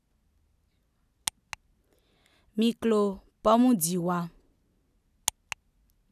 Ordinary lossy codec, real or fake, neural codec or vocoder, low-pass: none; real; none; 14.4 kHz